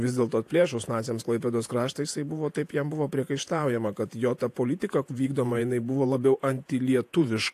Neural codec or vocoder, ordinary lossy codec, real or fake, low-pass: vocoder, 48 kHz, 128 mel bands, Vocos; AAC, 64 kbps; fake; 14.4 kHz